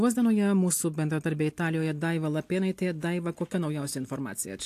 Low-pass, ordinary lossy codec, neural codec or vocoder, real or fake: 14.4 kHz; AAC, 64 kbps; none; real